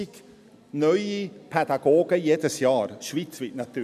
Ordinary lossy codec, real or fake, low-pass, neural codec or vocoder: none; real; 14.4 kHz; none